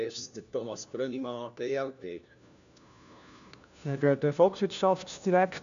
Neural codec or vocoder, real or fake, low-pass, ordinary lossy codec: codec, 16 kHz, 1 kbps, FunCodec, trained on LibriTTS, 50 frames a second; fake; 7.2 kHz; none